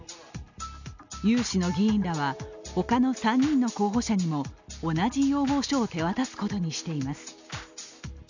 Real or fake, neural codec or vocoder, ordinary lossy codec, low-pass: real; none; none; 7.2 kHz